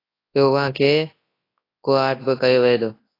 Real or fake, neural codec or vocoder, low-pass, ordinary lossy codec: fake; codec, 24 kHz, 0.9 kbps, WavTokenizer, large speech release; 5.4 kHz; AAC, 24 kbps